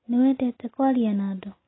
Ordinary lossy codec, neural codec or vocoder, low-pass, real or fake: AAC, 16 kbps; none; 7.2 kHz; real